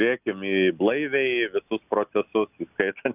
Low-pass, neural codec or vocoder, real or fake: 3.6 kHz; none; real